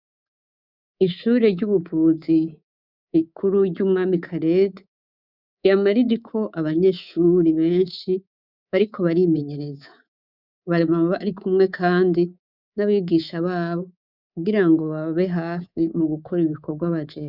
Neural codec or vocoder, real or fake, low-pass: codec, 24 kHz, 3.1 kbps, DualCodec; fake; 5.4 kHz